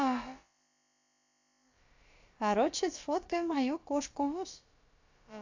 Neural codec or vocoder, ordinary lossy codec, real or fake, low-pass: codec, 16 kHz, about 1 kbps, DyCAST, with the encoder's durations; AAC, 48 kbps; fake; 7.2 kHz